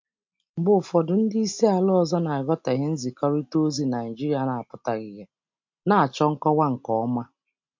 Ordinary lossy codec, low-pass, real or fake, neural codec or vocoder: MP3, 48 kbps; 7.2 kHz; real; none